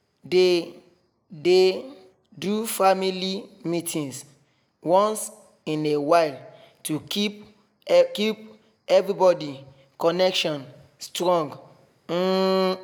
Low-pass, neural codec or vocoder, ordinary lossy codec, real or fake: none; none; none; real